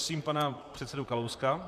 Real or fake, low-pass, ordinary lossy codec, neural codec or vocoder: fake; 14.4 kHz; MP3, 96 kbps; vocoder, 44.1 kHz, 128 mel bands, Pupu-Vocoder